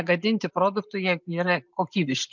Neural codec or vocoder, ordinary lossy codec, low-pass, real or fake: none; MP3, 64 kbps; 7.2 kHz; real